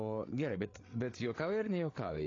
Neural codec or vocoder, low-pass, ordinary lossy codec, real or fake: codec, 16 kHz, 8 kbps, FreqCodec, larger model; 7.2 kHz; AAC, 32 kbps; fake